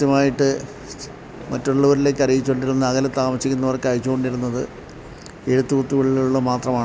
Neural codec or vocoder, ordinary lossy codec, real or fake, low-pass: none; none; real; none